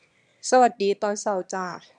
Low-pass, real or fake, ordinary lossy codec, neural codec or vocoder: 9.9 kHz; fake; MP3, 96 kbps; autoencoder, 22.05 kHz, a latent of 192 numbers a frame, VITS, trained on one speaker